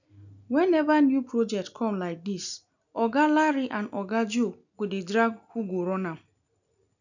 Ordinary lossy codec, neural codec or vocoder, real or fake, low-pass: none; none; real; 7.2 kHz